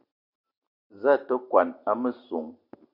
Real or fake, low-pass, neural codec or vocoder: real; 5.4 kHz; none